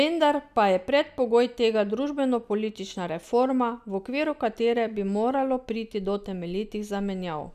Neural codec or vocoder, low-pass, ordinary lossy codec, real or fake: none; 14.4 kHz; none; real